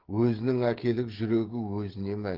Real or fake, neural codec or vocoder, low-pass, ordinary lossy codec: fake; codec, 16 kHz, 16 kbps, FreqCodec, smaller model; 5.4 kHz; Opus, 16 kbps